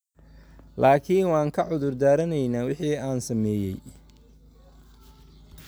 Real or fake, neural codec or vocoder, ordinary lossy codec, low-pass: real; none; none; none